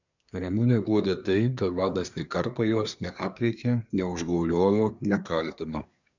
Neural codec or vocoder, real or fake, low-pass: codec, 24 kHz, 1 kbps, SNAC; fake; 7.2 kHz